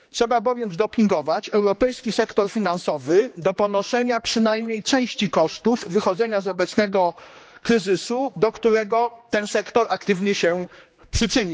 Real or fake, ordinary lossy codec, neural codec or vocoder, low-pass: fake; none; codec, 16 kHz, 2 kbps, X-Codec, HuBERT features, trained on general audio; none